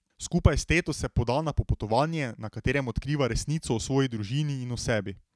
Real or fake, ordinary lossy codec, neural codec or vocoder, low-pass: real; none; none; none